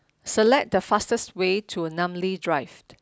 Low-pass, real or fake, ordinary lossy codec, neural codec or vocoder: none; real; none; none